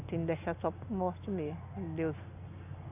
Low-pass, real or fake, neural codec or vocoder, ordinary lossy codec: 3.6 kHz; real; none; none